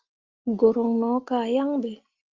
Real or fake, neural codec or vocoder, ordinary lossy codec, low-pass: real; none; Opus, 24 kbps; 7.2 kHz